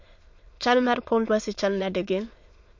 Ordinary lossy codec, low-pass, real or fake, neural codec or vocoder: MP3, 48 kbps; 7.2 kHz; fake; autoencoder, 22.05 kHz, a latent of 192 numbers a frame, VITS, trained on many speakers